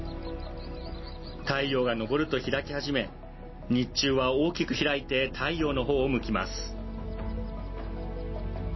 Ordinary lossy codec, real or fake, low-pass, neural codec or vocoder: MP3, 24 kbps; real; 7.2 kHz; none